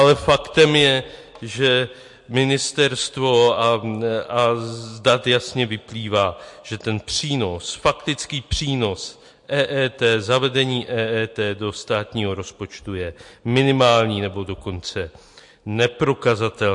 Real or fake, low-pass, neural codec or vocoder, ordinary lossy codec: real; 10.8 kHz; none; MP3, 48 kbps